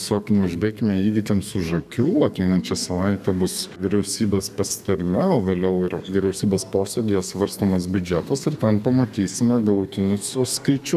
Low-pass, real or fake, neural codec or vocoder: 14.4 kHz; fake; codec, 32 kHz, 1.9 kbps, SNAC